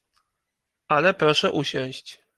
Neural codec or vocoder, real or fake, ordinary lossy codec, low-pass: none; real; Opus, 24 kbps; 14.4 kHz